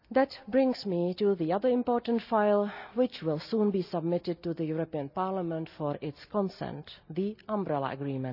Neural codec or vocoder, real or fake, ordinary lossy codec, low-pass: none; real; none; 5.4 kHz